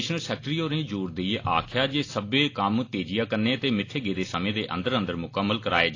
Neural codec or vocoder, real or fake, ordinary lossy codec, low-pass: none; real; AAC, 32 kbps; 7.2 kHz